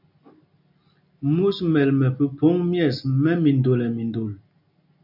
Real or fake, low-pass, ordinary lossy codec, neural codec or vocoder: real; 5.4 kHz; AAC, 48 kbps; none